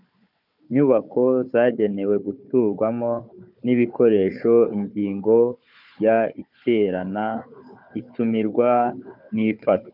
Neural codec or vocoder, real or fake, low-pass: codec, 16 kHz, 4 kbps, FunCodec, trained on Chinese and English, 50 frames a second; fake; 5.4 kHz